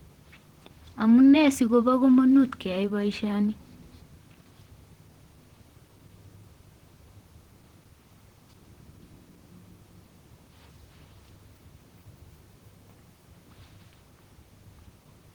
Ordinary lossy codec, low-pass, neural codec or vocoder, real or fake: Opus, 16 kbps; 19.8 kHz; vocoder, 44.1 kHz, 128 mel bands, Pupu-Vocoder; fake